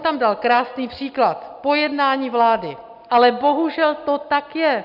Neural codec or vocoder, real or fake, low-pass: none; real; 5.4 kHz